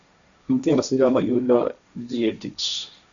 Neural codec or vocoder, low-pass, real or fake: codec, 16 kHz, 1.1 kbps, Voila-Tokenizer; 7.2 kHz; fake